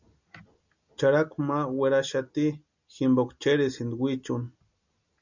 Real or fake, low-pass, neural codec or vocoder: real; 7.2 kHz; none